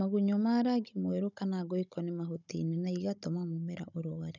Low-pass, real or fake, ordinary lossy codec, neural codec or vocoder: 7.2 kHz; fake; none; codec, 16 kHz, 16 kbps, FunCodec, trained on Chinese and English, 50 frames a second